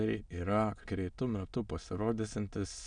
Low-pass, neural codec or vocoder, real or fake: 9.9 kHz; autoencoder, 22.05 kHz, a latent of 192 numbers a frame, VITS, trained on many speakers; fake